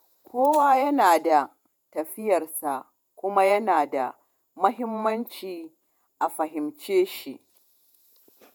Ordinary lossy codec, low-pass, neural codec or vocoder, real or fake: none; none; vocoder, 48 kHz, 128 mel bands, Vocos; fake